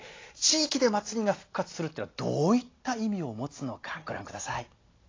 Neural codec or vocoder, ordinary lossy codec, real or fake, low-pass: none; AAC, 32 kbps; real; 7.2 kHz